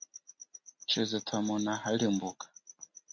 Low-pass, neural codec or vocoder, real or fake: 7.2 kHz; none; real